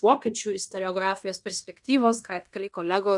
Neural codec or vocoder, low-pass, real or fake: codec, 16 kHz in and 24 kHz out, 0.9 kbps, LongCat-Audio-Codec, fine tuned four codebook decoder; 10.8 kHz; fake